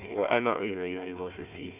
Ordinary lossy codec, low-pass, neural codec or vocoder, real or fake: none; 3.6 kHz; codec, 16 kHz, 1 kbps, FunCodec, trained on Chinese and English, 50 frames a second; fake